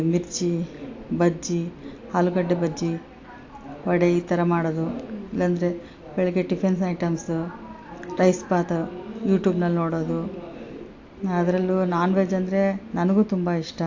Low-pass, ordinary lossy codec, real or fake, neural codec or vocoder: 7.2 kHz; AAC, 48 kbps; real; none